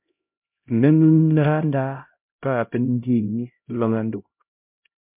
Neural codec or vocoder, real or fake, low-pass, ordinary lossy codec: codec, 16 kHz, 0.5 kbps, X-Codec, HuBERT features, trained on LibriSpeech; fake; 3.6 kHz; MP3, 32 kbps